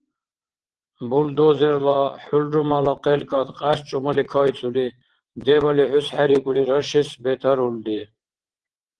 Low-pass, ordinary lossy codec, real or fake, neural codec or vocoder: 9.9 kHz; Opus, 16 kbps; fake; vocoder, 22.05 kHz, 80 mel bands, WaveNeXt